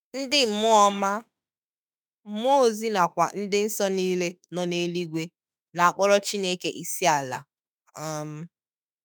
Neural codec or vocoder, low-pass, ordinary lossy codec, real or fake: autoencoder, 48 kHz, 32 numbers a frame, DAC-VAE, trained on Japanese speech; none; none; fake